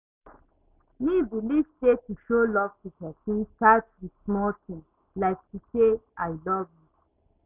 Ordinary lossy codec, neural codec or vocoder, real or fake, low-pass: none; none; real; 3.6 kHz